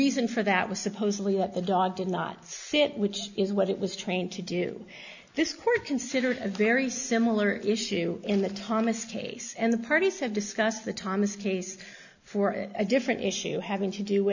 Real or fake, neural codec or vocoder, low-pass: real; none; 7.2 kHz